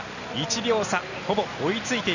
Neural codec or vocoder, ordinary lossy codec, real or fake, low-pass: none; none; real; 7.2 kHz